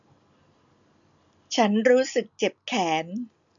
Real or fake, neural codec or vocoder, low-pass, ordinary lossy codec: real; none; 7.2 kHz; none